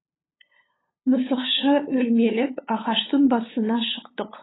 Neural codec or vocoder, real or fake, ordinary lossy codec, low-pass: codec, 16 kHz, 8 kbps, FunCodec, trained on LibriTTS, 25 frames a second; fake; AAC, 16 kbps; 7.2 kHz